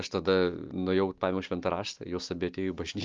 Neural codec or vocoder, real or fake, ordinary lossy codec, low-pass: none; real; Opus, 32 kbps; 7.2 kHz